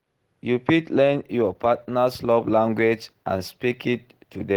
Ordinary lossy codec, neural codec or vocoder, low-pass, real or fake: Opus, 16 kbps; none; 19.8 kHz; real